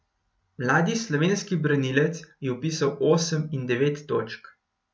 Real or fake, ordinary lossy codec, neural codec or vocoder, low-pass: real; none; none; none